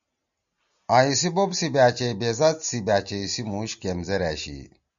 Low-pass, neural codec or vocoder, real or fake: 7.2 kHz; none; real